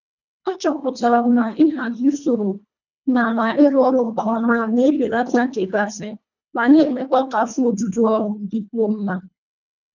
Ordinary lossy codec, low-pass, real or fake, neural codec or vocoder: none; 7.2 kHz; fake; codec, 24 kHz, 1.5 kbps, HILCodec